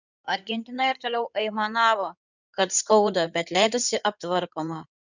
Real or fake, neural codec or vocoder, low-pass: fake; codec, 16 kHz in and 24 kHz out, 2.2 kbps, FireRedTTS-2 codec; 7.2 kHz